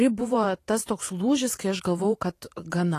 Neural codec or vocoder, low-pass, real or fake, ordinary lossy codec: vocoder, 44.1 kHz, 128 mel bands every 512 samples, BigVGAN v2; 14.4 kHz; fake; AAC, 48 kbps